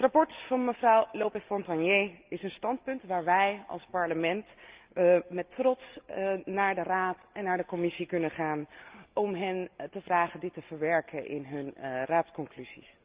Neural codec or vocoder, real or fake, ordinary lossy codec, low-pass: none; real; Opus, 32 kbps; 3.6 kHz